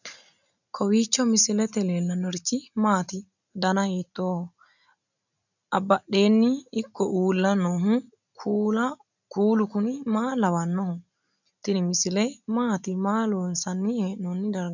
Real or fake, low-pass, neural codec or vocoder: real; 7.2 kHz; none